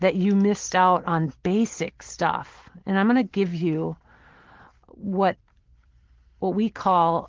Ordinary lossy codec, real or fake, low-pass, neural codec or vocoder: Opus, 16 kbps; real; 7.2 kHz; none